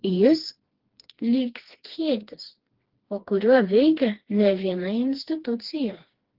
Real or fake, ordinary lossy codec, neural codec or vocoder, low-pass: fake; Opus, 16 kbps; codec, 16 kHz, 2 kbps, FreqCodec, smaller model; 5.4 kHz